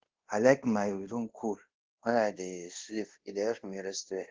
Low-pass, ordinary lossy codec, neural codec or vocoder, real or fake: 7.2 kHz; Opus, 16 kbps; codec, 24 kHz, 0.5 kbps, DualCodec; fake